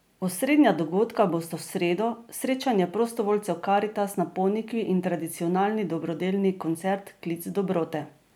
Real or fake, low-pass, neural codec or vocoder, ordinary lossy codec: real; none; none; none